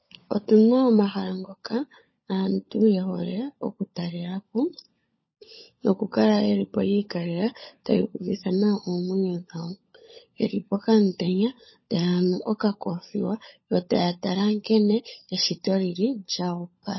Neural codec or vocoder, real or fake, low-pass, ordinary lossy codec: codec, 16 kHz, 16 kbps, FunCodec, trained on LibriTTS, 50 frames a second; fake; 7.2 kHz; MP3, 24 kbps